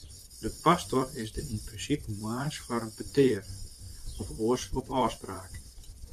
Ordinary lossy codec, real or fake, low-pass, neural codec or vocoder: MP3, 96 kbps; fake; 14.4 kHz; vocoder, 44.1 kHz, 128 mel bands, Pupu-Vocoder